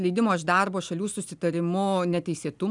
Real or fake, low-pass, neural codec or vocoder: real; 10.8 kHz; none